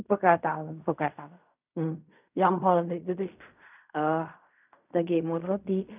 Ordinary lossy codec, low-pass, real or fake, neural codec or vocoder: none; 3.6 kHz; fake; codec, 16 kHz in and 24 kHz out, 0.4 kbps, LongCat-Audio-Codec, fine tuned four codebook decoder